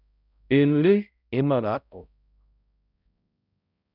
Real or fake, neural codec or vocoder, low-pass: fake; codec, 16 kHz, 0.5 kbps, X-Codec, HuBERT features, trained on balanced general audio; 5.4 kHz